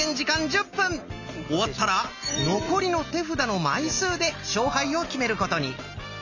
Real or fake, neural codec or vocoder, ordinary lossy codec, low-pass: real; none; none; 7.2 kHz